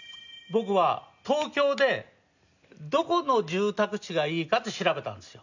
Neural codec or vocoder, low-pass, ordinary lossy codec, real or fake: none; 7.2 kHz; none; real